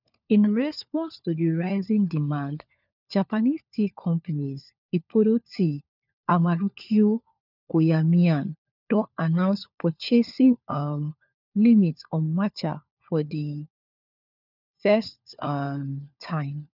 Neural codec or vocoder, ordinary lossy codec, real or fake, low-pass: codec, 16 kHz, 4 kbps, FunCodec, trained on LibriTTS, 50 frames a second; none; fake; 5.4 kHz